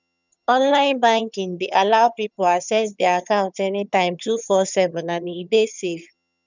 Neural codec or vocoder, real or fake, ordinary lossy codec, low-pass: vocoder, 22.05 kHz, 80 mel bands, HiFi-GAN; fake; none; 7.2 kHz